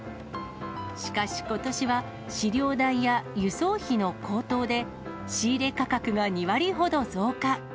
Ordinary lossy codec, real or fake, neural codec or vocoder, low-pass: none; real; none; none